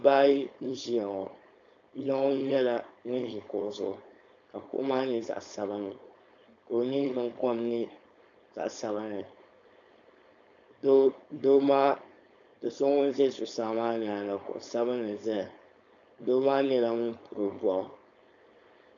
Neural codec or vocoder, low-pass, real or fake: codec, 16 kHz, 4.8 kbps, FACodec; 7.2 kHz; fake